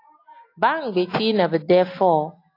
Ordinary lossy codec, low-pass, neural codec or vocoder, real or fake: AAC, 32 kbps; 5.4 kHz; none; real